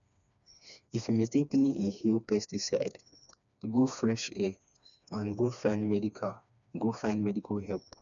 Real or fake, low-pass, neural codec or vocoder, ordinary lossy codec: fake; 7.2 kHz; codec, 16 kHz, 2 kbps, FreqCodec, smaller model; none